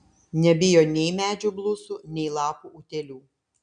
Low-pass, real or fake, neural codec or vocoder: 9.9 kHz; real; none